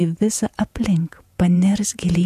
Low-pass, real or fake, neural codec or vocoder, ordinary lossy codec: 14.4 kHz; fake; vocoder, 48 kHz, 128 mel bands, Vocos; AAC, 96 kbps